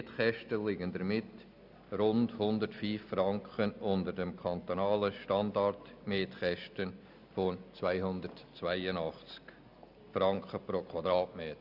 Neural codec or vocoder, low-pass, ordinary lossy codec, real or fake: none; 5.4 kHz; none; real